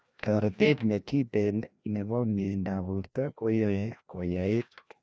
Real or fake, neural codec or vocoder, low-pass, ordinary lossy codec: fake; codec, 16 kHz, 1 kbps, FreqCodec, larger model; none; none